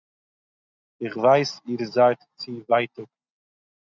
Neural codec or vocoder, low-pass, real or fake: none; 7.2 kHz; real